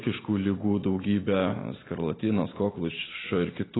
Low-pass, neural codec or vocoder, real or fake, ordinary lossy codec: 7.2 kHz; none; real; AAC, 16 kbps